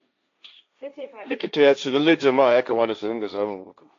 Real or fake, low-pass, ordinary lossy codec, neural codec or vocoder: fake; 7.2 kHz; AAC, 64 kbps; codec, 16 kHz, 1.1 kbps, Voila-Tokenizer